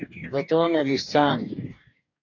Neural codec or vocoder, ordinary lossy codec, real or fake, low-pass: codec, 44.1 kHz, 2.6 kbps, DAC; AAC, 48 kbps; fake; 7.2 kHz